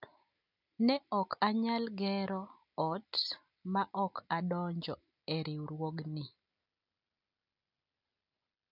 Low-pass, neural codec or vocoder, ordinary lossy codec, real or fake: 5.4 kHz; none; none; real